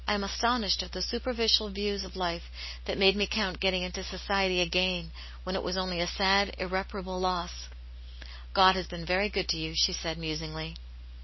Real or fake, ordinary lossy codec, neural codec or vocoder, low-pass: real; MP3, 24 kbps; none; 7.2 kHz